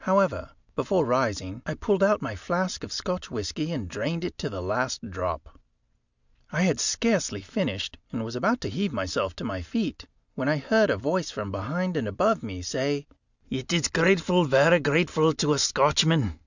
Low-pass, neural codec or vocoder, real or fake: 7.2 kHz; none; real